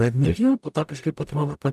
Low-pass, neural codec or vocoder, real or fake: 14.4 kHz; codec, 44.1 kHz, 0.9 kbps, DAC; fake